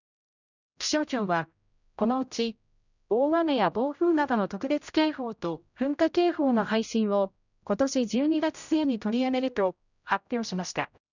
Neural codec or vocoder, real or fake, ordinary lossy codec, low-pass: codec, 16 kHz, 0.5 kbps, X-Codec, HuBERT features, trained on general audio; fake; none; 7.2 kHz